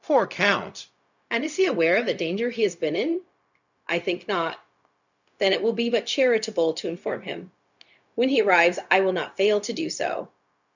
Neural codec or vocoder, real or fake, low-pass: codec, 16 kHz, 0.4 kbps, LongCat-Audio-Codec; fake; 7.2 kHz